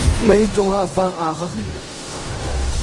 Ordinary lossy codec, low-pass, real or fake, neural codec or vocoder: Opus, 32 kbps; 10.8 kHz; fake; codec, 16 kHz in and 24 kHz out, 0.4 kbps, LongCat-Audio-Codec, fine tuned four codebook decoder